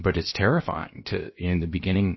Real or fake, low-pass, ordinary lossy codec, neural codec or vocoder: fake; 7.2 kHz; MP3, 24 kbps; codec, 16 kHz, 0.7 kbps, FocalCodec